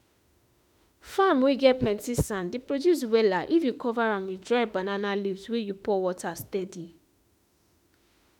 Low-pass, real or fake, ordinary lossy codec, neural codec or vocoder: 19.8 kHz; fake; none; autoencoder, 48 kHz, 32 numbers a frame, DAC-VAE, trained on Japanese speech